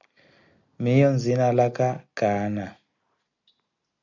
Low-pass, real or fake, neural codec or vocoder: 7.2 kHz; real; none